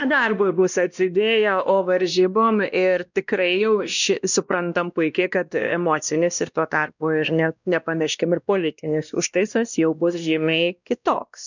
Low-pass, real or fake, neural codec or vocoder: 7.2 kHz; fake; codec, 16 kHz, 1 kbps, X-Codec, WavLM features, trained on Multilingual LibriSpeech